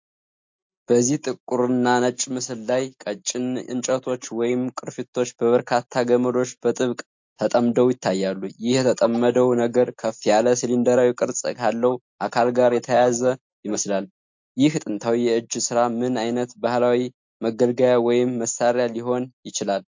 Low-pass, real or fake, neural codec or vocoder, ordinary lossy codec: 7.2 kHz; real; none; MP3, 48 kbps